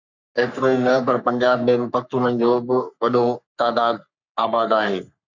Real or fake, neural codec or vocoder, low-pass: fake; codec, 44.1 kHz, 3.4 kbps, Pupu-Codec; 7.2 kHz